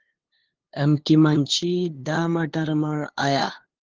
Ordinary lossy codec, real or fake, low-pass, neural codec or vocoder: Opus, 16 kbps; fake; 7.2 kHz; codec, 16 kHz, 2 kbps, FunCodec, trained on LibriTTS, 25 frames a second